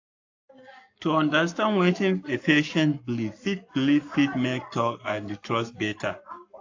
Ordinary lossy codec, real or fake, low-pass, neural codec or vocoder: AAC, 48 kbps; fake; 7.2 kHz; codec, 44.1 kHz, 7.8 kbps, Pupu-Codec